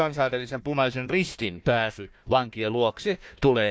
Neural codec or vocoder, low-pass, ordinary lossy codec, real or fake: codec, 16 kHz, 1 kbps, FunCodec, trained on Chinese and English, 50 frames a second; none; none; fake